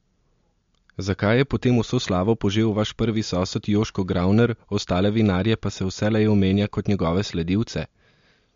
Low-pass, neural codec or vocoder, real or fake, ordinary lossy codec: 7.2 kHz; none; real; MP3, 48 kbps